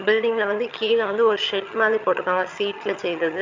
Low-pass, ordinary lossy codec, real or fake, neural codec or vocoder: 7.2 kHz; MP3, 64 kbps; fake; vocoder, 22.05 kHz, 80 mel bands, HiFi-GAN